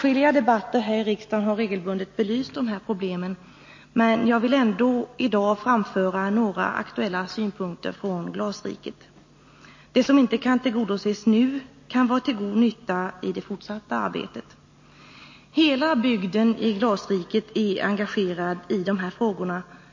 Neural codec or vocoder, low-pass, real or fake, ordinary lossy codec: none; 7.2 kHz; real; MP3, 32 kbps